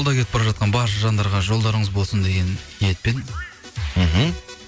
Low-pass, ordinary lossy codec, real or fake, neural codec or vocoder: none; none; real; none